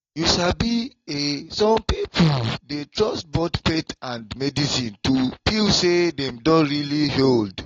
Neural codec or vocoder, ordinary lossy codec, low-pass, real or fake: none; AAC, 32 kbps; 7.2 kHz; real